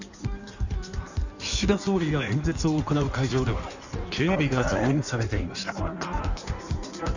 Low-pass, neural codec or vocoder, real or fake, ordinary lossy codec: 7.2 kHz; codec, 16 kHz in and 24 kHz out, 1.1 kbps, FireRedTTS-2 codec; fake; none